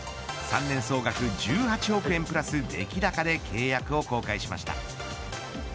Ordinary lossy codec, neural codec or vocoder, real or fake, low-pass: none; none; real; none